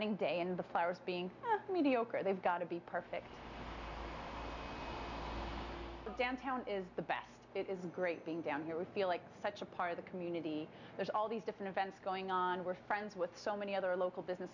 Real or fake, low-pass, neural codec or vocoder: real; 7.2 kHz; none